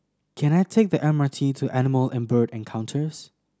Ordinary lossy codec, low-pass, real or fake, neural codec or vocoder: none; none; real; none